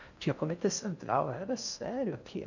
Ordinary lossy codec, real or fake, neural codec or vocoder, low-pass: none; fake; codec, 16 kHz in and 24 kHz out, 0.6 kbps, FocalCodec, streaming, 4096 codes; 7.2 kHz